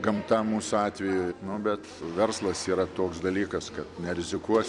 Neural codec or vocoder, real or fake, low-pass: vocoder, 44.1 kHz, 128 mel bands every 256 samples, BigVGAN v2; fake; 10.8 kHz